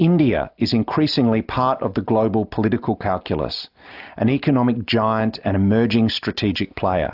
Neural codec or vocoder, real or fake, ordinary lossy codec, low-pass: none; real; AAC, 48 kbps; 5.4 kHz